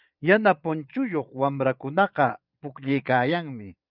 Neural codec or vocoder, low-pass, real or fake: vocoder, 22.05 kHz, 80 mel bands, Vocos; 5.4 kHz; fake